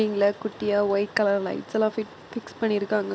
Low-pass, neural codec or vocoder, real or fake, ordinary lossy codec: none; none; real; none